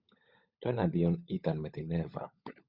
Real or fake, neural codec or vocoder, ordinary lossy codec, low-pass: fake; codec, 16 kHz, 16 kbps, FunCodec, trained on LibriTTS, 50 frames a second; Opus, 64 kbps; 5.4 kHz